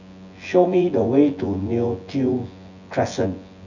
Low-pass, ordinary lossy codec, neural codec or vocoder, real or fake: 7.2 kHz; none; vocoder, 24 kHz, 100 mel bands, Vocos; fake